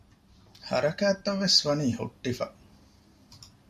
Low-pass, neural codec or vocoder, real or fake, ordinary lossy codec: 14.4 kHz; none; real; MP3, 64 kbps